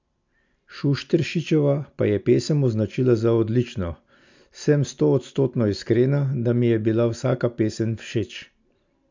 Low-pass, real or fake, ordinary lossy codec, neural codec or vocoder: 7.2 kHz; real; MP3, 64 kbps; none